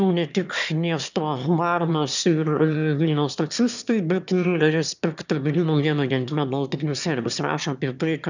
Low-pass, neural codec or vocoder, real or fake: 7.2 kHz; autoencoder, 22.05 kHz, a latent of 192 numbers a frame, VITS, trained on one speaker; fake